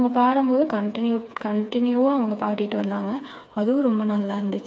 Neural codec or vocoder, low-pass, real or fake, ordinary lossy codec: codec, 16 kHz, 4 kbps, FreqCodec, smaller model; none; fake; none